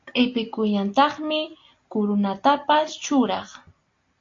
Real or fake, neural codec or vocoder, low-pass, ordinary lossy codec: real; none; 7.2 kHz; AAC, 48 kbps